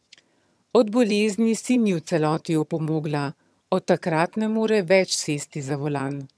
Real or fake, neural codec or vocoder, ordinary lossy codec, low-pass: fake; vocoder, 22.05 kHz, 80 mel bands, HiFi-GAN; none; none